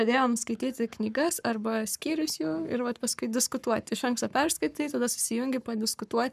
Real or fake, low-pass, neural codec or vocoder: fake; 14.4 kHz; codec, 44.1 kHz, 7.8 kbps, Pupu-Codec